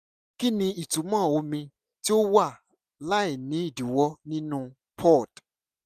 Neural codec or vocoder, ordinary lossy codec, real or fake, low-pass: none; none; real; 14.4 kHz